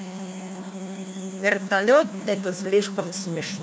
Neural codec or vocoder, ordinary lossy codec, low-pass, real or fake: codec, 16 kHz, 1 kbps, FunCodec, trained on LibriTTS, 50 frames a second; none; none; fake